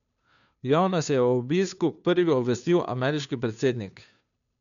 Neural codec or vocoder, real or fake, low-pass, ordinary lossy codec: codec, 16 kHz, 2 kbps, FunCodec, trained on Chinese and English, 25 frames a second; fake; 7.2 kHz; none